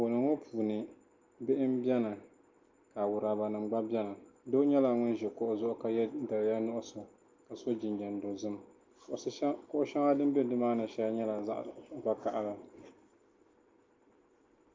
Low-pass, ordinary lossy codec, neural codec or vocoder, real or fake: 7.2 kHz; Opus, 24 kbps; none; real